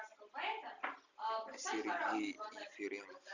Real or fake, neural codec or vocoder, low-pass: real; none; 7.2 kHz